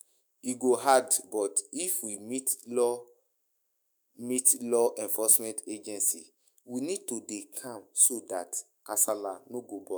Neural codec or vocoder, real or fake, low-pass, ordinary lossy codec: autoencoder, 48 kHz, 128 numbers a frame, DAC-VAE, trained on Japanese speech; fake; none; none